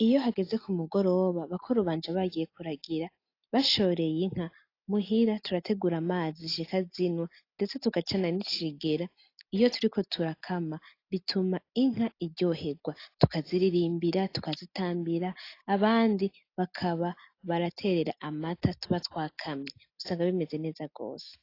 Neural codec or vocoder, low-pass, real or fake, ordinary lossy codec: none; 5.4 kHz; real; AAC, 32 kbps